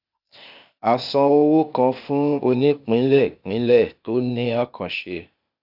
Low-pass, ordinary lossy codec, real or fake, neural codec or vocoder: 5.4 kHz; none; fake; codec, 16 kHz, 0.8 kbps, ZipCodec